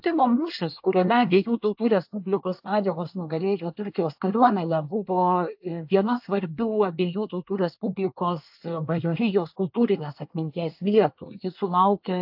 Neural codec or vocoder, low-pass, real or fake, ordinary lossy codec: codec, 24 kHz, 1 kbps, SNAC; 5.4 kHz; fake; AAC, 48 kbps